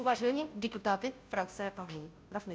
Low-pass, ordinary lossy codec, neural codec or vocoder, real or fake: none; none; codec, 16 kHz, 0.5 kbps, FunCodec, trained on Chinese and English, 25 frames a second; fake